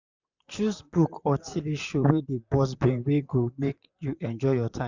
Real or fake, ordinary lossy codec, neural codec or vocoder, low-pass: fake; Opus, 64 kbps; vocoder, 44.1 kHz, 128 mel bands, Pupu-Vocoder; 7.2 kHz